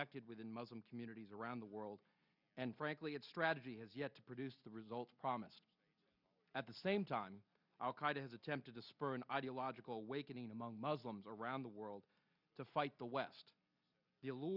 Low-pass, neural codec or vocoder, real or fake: 5.4 kHz; none; real